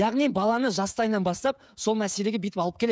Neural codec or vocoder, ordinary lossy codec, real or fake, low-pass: codec, 16 kHz, 16 kbps, FreqCodec, smaller model; none; fake; none